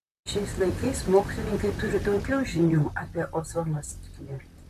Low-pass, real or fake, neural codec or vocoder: 14.4 kHz; fake; vocoder, 44.1 kHz, 128 mel bands, Pupu-Vocoder